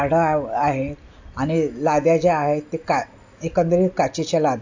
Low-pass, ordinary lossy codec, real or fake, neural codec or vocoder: 7.2 kHz; AAC, 48 kbps; real; none